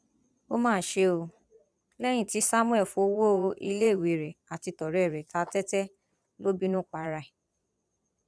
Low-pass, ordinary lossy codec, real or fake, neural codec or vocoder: none; none; fake; vocoder, 22.05 kHz, 80 mel bands, Vocos